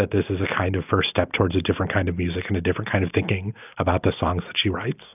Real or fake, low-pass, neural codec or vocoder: real; 3.6 kHz; none